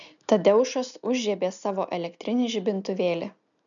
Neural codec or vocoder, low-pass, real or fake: none; 7.2 kHz; real